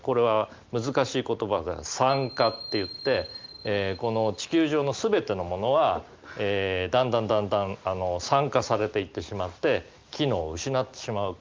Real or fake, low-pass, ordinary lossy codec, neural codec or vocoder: real; 7.2 kHz; Opus, 24 kbps; none